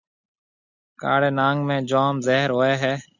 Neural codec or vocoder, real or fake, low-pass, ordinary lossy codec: none; real; 7.2 kHz; Opus, 64 kbps